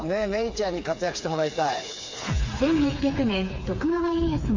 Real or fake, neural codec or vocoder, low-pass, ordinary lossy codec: fake; codec, 16 kHz, 4 kbps, FreqCodec, smaller model; 7.2 kHz; MP3, 48 kbps